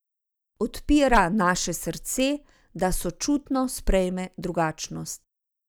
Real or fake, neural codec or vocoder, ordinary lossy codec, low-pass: real; none; none; none